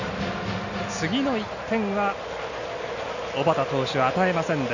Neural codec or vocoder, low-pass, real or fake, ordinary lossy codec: none; 7.2 kHz; real; none